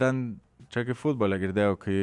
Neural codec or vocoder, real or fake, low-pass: none; real; 10.8 kHz